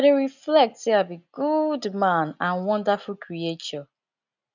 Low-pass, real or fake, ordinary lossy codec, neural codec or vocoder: 7.2 kHz; real; none; none